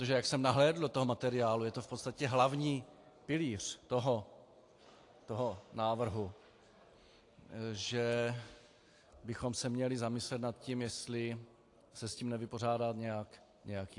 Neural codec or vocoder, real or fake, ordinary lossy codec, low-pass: none; real; AAC, 48 kbps; 10.8 kHz